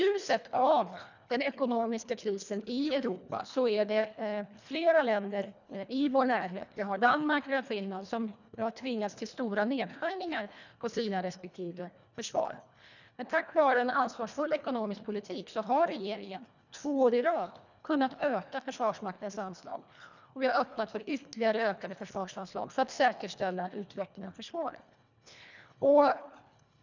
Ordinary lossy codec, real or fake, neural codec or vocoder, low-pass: none; fake; codec, 24 kHz, 1.5 kbps, HILCodec; 7.2 kHz